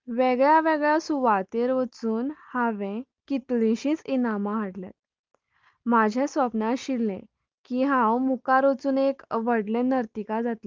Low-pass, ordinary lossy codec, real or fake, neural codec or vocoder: 7.2 kHz; Opus, 24 kbps; real; none